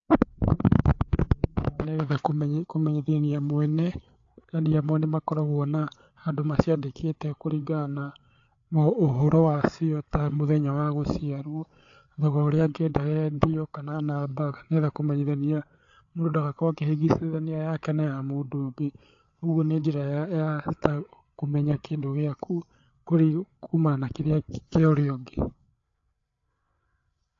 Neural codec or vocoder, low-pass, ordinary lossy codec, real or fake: codec, 16 kHz, 8 kbps, FreqCodec, larger model; 7.2 kHz; AAC, 48 kbps; fake